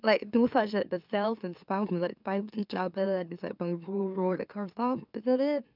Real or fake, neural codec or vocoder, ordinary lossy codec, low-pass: fake; autoencoder, 44.1 kHz, a latent of 192 numbers a frame, MeloTTS; none; 5.4 kHz